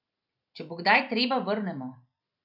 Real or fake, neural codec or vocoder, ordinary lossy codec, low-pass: real; none; none; 5.4 kHz